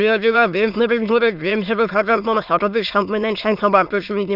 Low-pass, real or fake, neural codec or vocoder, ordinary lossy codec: 5.4 kHz; fake; autoencoder, 22.05 kHz, a latent of 192 numbers a frame, VITS, trained on many speakers; none